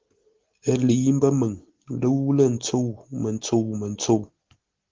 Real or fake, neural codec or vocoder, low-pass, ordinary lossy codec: real; none; 7.2 kHz; Opus, 16 kbps